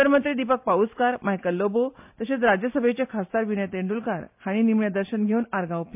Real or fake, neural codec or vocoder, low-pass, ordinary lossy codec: real; none; 3.6 kHz; MP3, 32 kbps